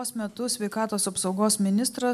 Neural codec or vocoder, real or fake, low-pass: none; real; 14.4 kHz